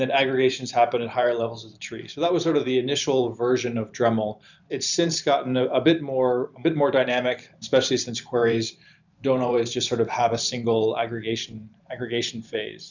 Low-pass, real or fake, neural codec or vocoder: 7.2 kHz; fake; vocoder, 44.1 kHz, 128 mel bands every 512 samples, BigVGAN v2